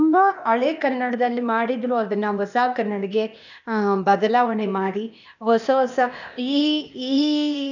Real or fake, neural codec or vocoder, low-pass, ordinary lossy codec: fake; codec, 16 kHz, 0.8 kbps, ZipCodec; 7.2 kHz; none